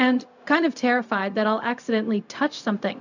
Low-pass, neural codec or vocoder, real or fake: 7.2 kHz; codec, 16 kHz, 0.4 kbps, LongCat-Audio-Codec; fake